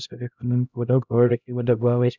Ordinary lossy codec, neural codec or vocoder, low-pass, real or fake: none; codec, 16 kHz, 0.5 kbps, X-Codec, HuBERT features, trained on LibriSpeech; 7.2 kHz; fake